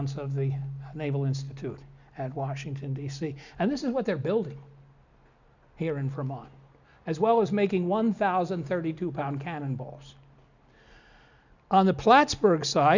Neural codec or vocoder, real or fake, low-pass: none; real; 7.2 kHz